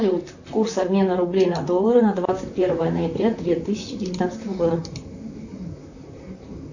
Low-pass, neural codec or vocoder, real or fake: 7.2 kHz; vocoder, 44.1 kHz, 128 mel bands, Pupu-Vocoder; fake